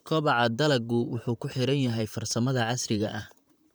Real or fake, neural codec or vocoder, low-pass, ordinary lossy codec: fake; vocoder, 44.1 kHz, 128 mel bands, Pupu-Vocoder; none; none